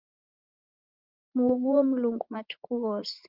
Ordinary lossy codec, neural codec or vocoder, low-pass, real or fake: Opus, 32 kbps; vocoder, 44.1 kHz, 80 mel bands, Vocos; 5.4 kHz; fake